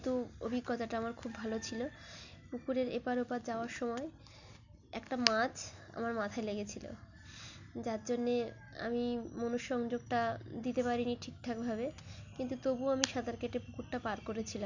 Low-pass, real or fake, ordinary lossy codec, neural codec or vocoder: 7.2 kHz; real; none; none